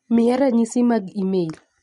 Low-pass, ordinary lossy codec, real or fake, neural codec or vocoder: 19.8 kHz; MP3, 48 kbps; real; none